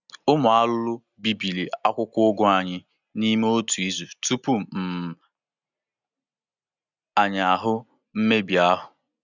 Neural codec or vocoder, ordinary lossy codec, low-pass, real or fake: none; none; 7.2 kHz; real